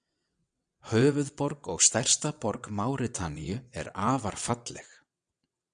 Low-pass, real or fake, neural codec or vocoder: 9.9 kHz; fake; vocoder, 22.05 kHz, 80 mel bands, WaveNeXt